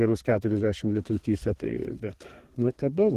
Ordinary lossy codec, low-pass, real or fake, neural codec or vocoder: Opus, 16 kbps; 14.4 kHz; fake; codec, 32 kHz, 1.9 kbps, SNAC